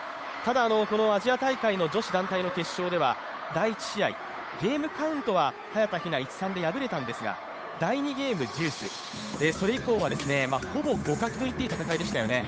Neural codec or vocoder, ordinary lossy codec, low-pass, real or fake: codec, 16 kHz, 8 kbps, FunCodec, trained on Chinese and English, 25 frames a second; none; none; fake